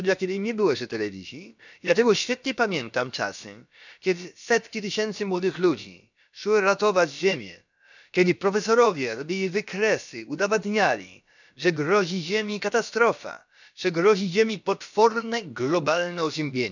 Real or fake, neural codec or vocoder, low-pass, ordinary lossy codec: fake; codec, 16 kHz, about 1 kbps, DyCAST, with the encoder's durations; 7.2 kHz; none